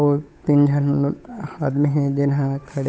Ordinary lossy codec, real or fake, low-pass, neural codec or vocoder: none; fake; none; codec, 16 kHz, 8 kbps, FunCodec, trained on Chinese and English, 25 frames a second